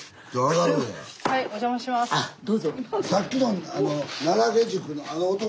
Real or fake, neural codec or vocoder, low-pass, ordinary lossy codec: real; none; none; none